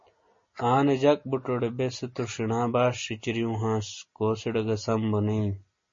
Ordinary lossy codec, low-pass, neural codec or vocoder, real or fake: MP3, 32 kbps; 7.2 kHz; none; real